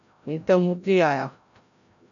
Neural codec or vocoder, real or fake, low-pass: codec, 16 kHz, 0.5 kbps, FreqCodec, larger model; fake; 7.2 kHz